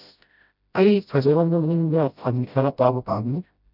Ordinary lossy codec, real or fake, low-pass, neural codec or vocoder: none; fake; 5.4 kHz; codec, 16 kHz, 0.5 kbps, FreqCodec, smaller model